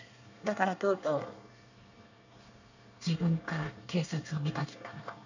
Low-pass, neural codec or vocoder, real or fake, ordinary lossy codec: 7.2 kHz; codec, 24 kHz, 1 kbps, SNAC; fake; none